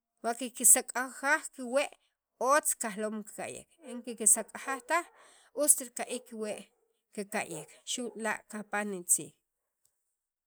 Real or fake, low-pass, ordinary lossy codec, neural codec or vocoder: real; none; none; none